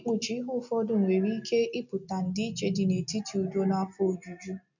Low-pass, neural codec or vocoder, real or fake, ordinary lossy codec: 7.2 kHz; none; real; none